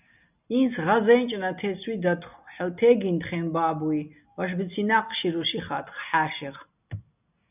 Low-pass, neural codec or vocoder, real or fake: 3.6 kHz; none; real